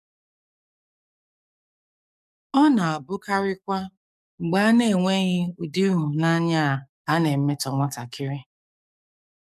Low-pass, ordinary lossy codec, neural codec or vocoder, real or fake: 14.4 kHz; none; codec, 44.1 kHz, 7.8 kbps, DAC; fake